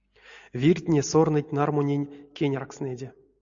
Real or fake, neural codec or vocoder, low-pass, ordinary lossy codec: real; none; 7.2 kHz; AAC, 64 kbps